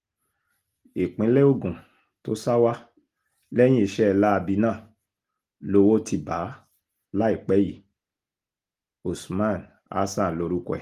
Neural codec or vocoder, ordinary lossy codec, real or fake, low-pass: none; Opus, 24 kbps; real; 14.4 kHz